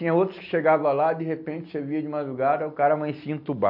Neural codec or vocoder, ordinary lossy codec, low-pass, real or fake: none; AAC, 48 kbps; 5.4 kHz; real